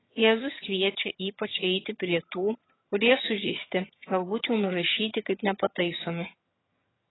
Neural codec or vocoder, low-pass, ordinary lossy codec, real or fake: vocoder, 22.05 kHz, 80 mel bands, HiFi-GAN; 7.2 kHz; AAC, 16 kbps; fake